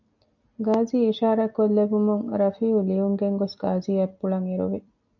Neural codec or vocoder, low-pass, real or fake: none; 7.2 kHz; real